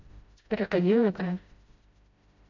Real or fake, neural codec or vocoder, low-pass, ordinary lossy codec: fake; codec, 16 kHz, 0.5 kbps, FreqCodec, smaller model; 7.2 kHz; AAC, 48 kbps